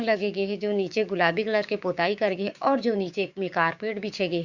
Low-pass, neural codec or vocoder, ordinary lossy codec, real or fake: 7.2 kHz; codec, 16 kHz, 6 kbps, DAC; Opus, 64 kbps; fake